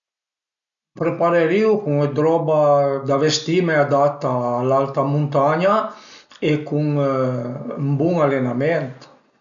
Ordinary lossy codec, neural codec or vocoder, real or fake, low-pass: none; none; real; 7.2 kHz